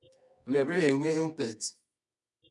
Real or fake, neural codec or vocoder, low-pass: fake; codec, 24 kHz, 0.9 kbps, WavTokenizer, medium music audio release; 10.8 kHz